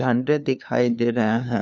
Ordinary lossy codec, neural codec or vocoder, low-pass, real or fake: none; codec, 16 kHz, 2 kbps, FunCodec, trained on LibriTTS, 25 frames a second; none; fake